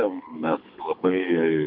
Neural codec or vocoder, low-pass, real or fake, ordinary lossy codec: codec, 24 kHz, 6 kbps, HILCodec; 5.4 kHz; fake; MP3, 48 kbps